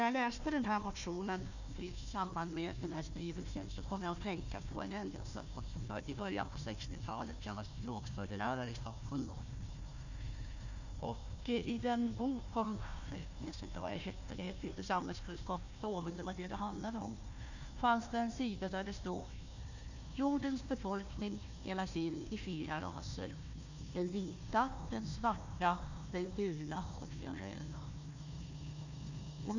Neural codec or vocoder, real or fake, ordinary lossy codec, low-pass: codec, 16 kHz, 1 kbps, FunCodec, trained on Chinese and English, 50 frames a second; fake; none; 7.2 kHz